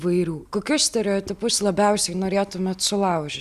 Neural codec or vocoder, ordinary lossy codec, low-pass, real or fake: none; Opus, 64 kbps; 14.4 kHz; real